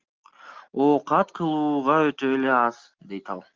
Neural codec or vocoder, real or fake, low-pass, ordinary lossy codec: none; real; 7.2 kHz; Opus, 32 kbps